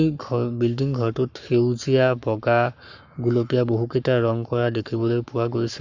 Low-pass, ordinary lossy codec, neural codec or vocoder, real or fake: 7.2 kHz; none; codec, 44.1 kHz, 7.8 kbps, Pupu-Codec; fake